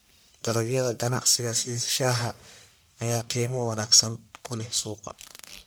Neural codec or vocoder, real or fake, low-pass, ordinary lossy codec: codec, 44.1 kHz, 1.7 kbps, Pupu-Codec; fake; none; none